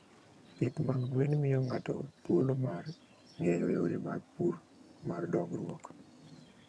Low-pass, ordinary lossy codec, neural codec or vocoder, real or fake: none; none; vocoder, 22.05 kHz, 80 mel bands, HiFi-GAN; fake